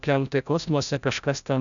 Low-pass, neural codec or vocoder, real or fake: 7.2 kHz; codec, 16 kHz, 0.5 kbps, FreqCodec, larger model; fake